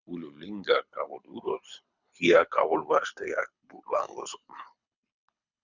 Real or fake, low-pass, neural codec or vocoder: fake; 7.2 kHz; codec, 24 kHz, 6 kbps, HILCodec